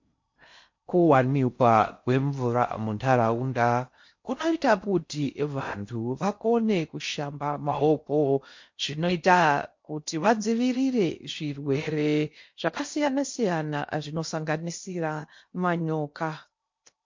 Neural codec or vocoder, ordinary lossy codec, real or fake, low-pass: codec, 16 kHz in and 24 kHz out, 0.6 kbps, FocalCodec, streaming, 4096 codes; MP3, 48 kbps; fake; 7.2 kHz